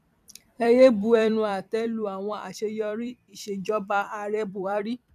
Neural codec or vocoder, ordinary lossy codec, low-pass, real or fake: vocoder, 44.1 kHz, 128 mel bands every 256 samples, BigVGAN v2; none; 14.4 kHz; fake